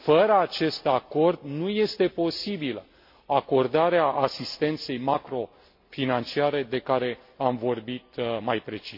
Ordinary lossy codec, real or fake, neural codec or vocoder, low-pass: MP3, 32 kbps; real; none; 5.4 kHz